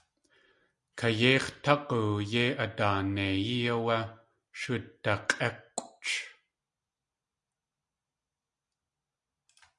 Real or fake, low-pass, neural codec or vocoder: real; 10.8 kHz; none